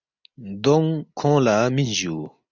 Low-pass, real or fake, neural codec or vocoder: 7.2 kHz; real; none